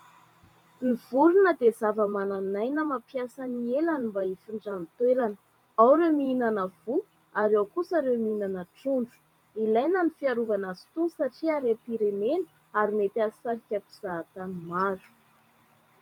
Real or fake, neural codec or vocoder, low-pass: fake; vocoder, 44.1 kHz, 128 mel bands every 512 samples, BigVGAN v2; 19.8 kHz